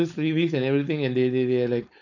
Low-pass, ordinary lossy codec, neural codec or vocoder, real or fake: 7.2 kHz; none; codec, 16 kHz, 4.8 kbps, FACodec; fake